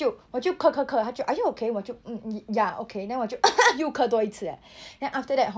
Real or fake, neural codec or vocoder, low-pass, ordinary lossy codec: real; none; none; none